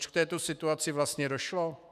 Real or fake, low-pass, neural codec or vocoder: fake; 14.4 kHz; autoencoder, 48 kHz, 128 numbers a frame, DAC-VAE, trained on Japanese speech